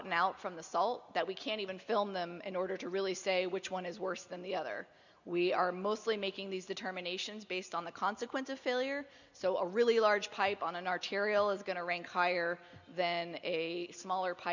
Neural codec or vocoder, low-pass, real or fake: none; 7.2 kHz; real